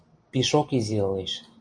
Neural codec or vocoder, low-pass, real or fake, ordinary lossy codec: none; 9.9 kHz; real; MP3, 48 kbps